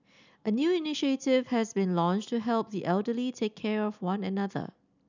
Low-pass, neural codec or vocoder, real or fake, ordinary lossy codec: 7.2 kHz; none; real; none